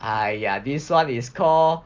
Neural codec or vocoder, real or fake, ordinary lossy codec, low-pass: none; real; Opus, 32 kbps; 7.2 kHz